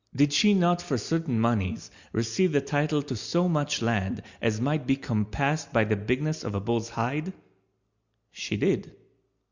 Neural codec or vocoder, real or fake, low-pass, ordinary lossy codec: none; real; 7.2 kHz; Opus, 64 kbps